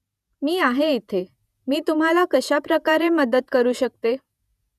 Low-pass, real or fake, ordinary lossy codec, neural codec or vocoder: 14.4 kHz; fake; none; vocoder, 48 kHz, 128 mel bands, Vocos